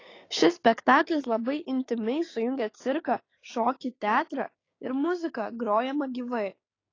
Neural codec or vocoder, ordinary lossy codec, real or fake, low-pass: codec, 16 kHz, 6 kbps, DAC; AAC, 32 kbps; fake; 7.2 kHz